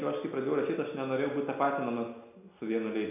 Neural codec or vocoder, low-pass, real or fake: none; 3.6 kHz; real